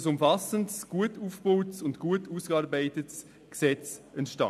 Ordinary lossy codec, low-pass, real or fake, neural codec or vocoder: none; 14.4 kHz; real; none